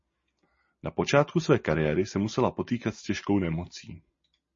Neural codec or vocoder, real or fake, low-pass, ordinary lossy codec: none; real; 7.2 kHz; MP3, 32 kbps